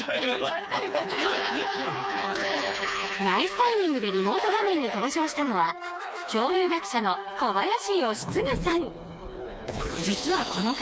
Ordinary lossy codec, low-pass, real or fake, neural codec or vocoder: none; none; fake; codec, 16 kHz, 2 kbps, FreqCodec, smaller model